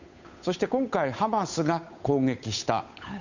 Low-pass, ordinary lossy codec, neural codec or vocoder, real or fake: 7.2 kHz; none; codec, 16 kHz, 8 kbps, FunCodec, trained on Chinese and English, 25 frames a second; fake